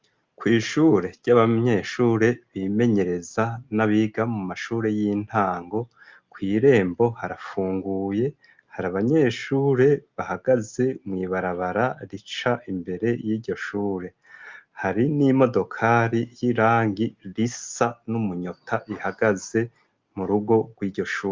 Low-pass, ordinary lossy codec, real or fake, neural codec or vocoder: 7.2 kHz; Opus, 24 kbps; real; none